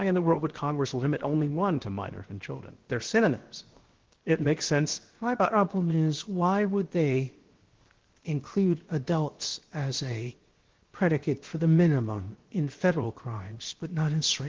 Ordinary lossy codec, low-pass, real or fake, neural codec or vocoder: Opus, 16 kbps; 7.2 kHz; fake; codec, 16 kHz, 0.7 kbps, FocalCodec